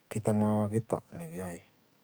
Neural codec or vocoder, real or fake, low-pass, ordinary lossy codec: codec, 44.1 kHz, 2.6 kbps, SNAC; fake; none; none